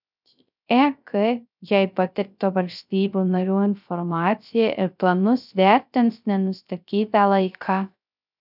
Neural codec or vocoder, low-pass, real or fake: codec, 16 kHz, 0.3 kbps, FocalCodec; 5.4 kHz; fake